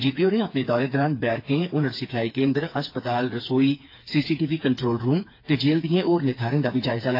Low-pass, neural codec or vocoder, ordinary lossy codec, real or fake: 5.4 kHz; codec, 16 kHz, 4 kbps, FreqCodec, smaller model; AAC, 32 kbps; fake